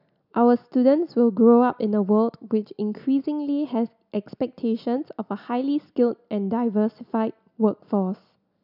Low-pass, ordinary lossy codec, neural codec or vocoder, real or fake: 5.4 kHz; none; none; real